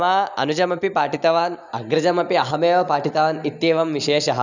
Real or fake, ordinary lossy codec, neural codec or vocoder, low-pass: real; none; none; 7.2 kHz